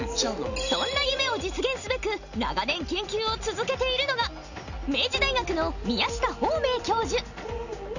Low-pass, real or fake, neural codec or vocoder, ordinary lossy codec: 7.2 kHz; real; none; none